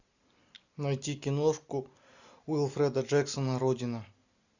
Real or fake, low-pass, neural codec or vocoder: real; 7.2 kHz; none